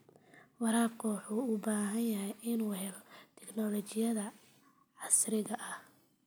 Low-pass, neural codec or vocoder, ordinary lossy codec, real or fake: none; none; none; real